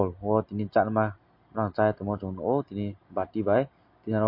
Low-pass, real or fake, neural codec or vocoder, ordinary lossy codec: 5.4 kHz; real; none; MP3, 32 kbps